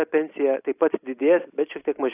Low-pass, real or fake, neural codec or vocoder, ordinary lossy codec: 3.6 kHz; real; none; AAC, 24 kbps